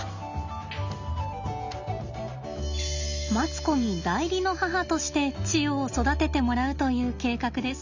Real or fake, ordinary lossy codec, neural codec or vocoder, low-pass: real; none; none; 7.2 kHz